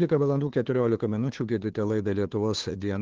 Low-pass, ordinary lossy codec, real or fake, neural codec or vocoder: 7.2 kHz; Opus, 16 kbps; fake; codec, 16 kHz, 2 kbps, FunCodec, trained on Chinese and English, 25 frames a second